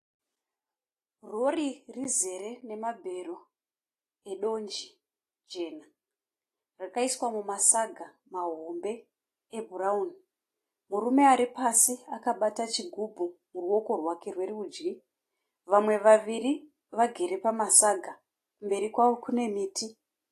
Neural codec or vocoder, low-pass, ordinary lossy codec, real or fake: none; 14.4 kHz; AAC, 48 kbps; real